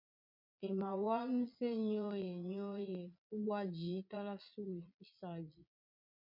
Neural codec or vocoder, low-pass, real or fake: vocoder, 22.05 kHz, 80 mel bands, Vocos; 5.4 kHz; fake